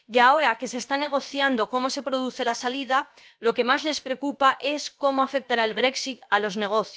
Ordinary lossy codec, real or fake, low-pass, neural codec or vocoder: none; fake; none; codec, 16 kHz, about 1 kbps, DyCAST, with the encoder's durations